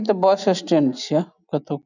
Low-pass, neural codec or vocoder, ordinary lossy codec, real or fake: 7.2 kHz; none; none; real